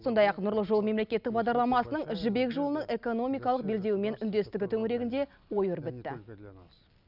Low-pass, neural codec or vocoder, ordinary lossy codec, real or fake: 5.4 kHz; none; none; real